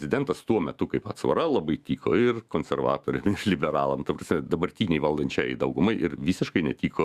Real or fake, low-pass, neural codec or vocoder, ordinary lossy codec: fake; 14.4 kHz; autoencoder, 48 kHz, 128 numbers a frame, DAC-VAE, trained on Japanese speech; Opus, 64 kbps